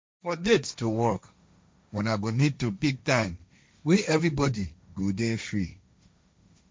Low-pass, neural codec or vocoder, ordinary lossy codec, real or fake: none; codec, 16 kHz, 1.1 kbps, Voila-Tokenizer; none; fake